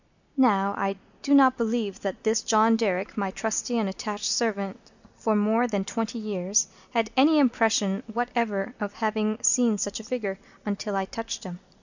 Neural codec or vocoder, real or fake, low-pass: none; real; 7.2 kHz